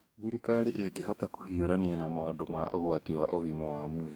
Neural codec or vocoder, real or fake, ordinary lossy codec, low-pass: codec, 44.1 kHz, 2.6 kbps, DAC; fake; none; none